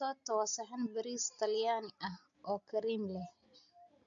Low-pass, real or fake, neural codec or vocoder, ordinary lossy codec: 7.2 kHz; real; none; AAC, 64 kbps